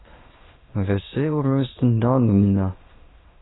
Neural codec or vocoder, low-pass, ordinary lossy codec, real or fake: autoencoder, 22.05 kHz, a latent of 192 numbers a frame, VITS, trained on many speakers; 7.2 kHz; AAC, 16 kbps; fake